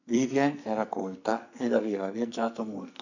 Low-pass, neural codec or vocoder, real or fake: 7.2 kHz; codec, 44.1 kHz, 2.6 kbps, SNAC; fake